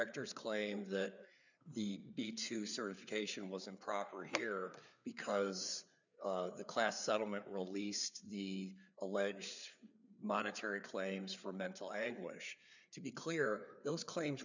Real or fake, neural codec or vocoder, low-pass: fake; codec, 16 kHz, 4 kbps, FreqCodec, larger model; 7.2 kHz